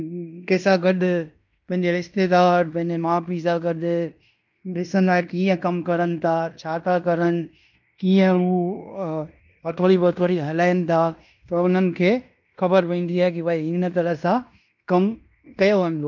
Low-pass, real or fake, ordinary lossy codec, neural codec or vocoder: 7.2 kHz; fake; none; codec, 16 kHz in and 24 kHz out, 0.9 kbps, LongCat-Audio-Codec, fine tuned four codebook decoder